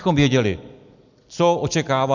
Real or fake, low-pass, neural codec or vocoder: real; 7.2 kHz; none